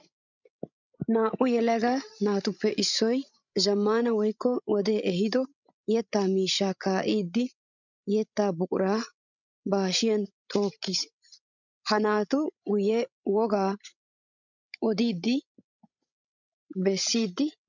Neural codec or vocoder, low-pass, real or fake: codec, 16 kHz, 16 kbps, FreqCodec, larger model; 7.2 kHz; fake